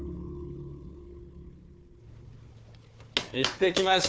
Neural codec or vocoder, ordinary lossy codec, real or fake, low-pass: codec, 16 kHz, 4 kbps, FunCodec, trained on Chinese and English, 50 frames a second; none; fake; none